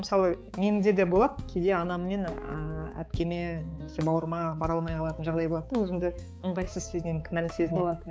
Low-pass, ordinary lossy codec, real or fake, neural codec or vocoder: none; none; fake; codec, 16 kHz, 4 kbps, X-Codec, HuBERT features, trained on balanced general audio